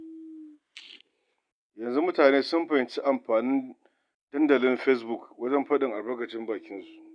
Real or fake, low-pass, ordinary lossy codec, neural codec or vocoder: real; 9.9 kHz; none; none